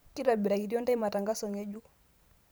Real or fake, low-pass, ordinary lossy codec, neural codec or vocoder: fake; none; none; vocoder, 44.1 kHz, 128 mel bands every 512 samples, BigVGAN v2